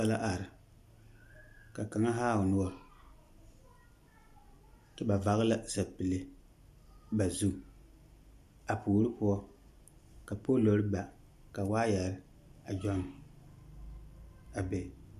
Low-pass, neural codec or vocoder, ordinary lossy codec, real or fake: 14.4 kHz; none; AAC, 96 kbps; real